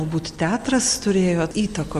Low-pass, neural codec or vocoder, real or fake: 14.4 kHz; none; real